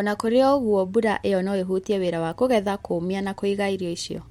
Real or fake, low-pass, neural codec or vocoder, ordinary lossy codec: real; 19.8 kHz; none; MP3, 64 kbps